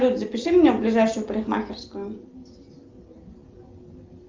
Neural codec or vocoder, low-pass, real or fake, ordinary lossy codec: none; 7.2 kHz; real; Opus, 32 kbps